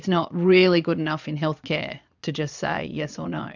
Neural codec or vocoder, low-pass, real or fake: vocoder, 22.05 kHz, 80 mel bands, Vocos; 7.2 kHz; fake